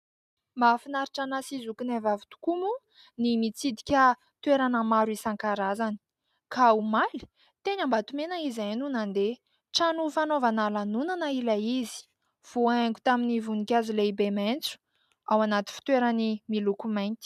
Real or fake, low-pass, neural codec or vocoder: real; 14.4 kHz; none